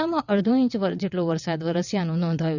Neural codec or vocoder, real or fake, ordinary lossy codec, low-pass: vocoder, 22.05 kHz, 80 mel bands, WaveNeXt; fake; none; 7.2 kHz